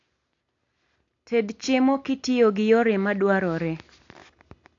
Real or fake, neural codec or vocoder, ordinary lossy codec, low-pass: real; none; AAC, 48 kbps; 7.2 kHz